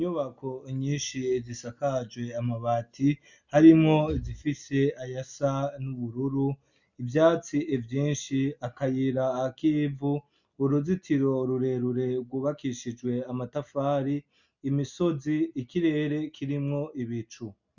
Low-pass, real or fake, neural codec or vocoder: 7.2 kHz; real; none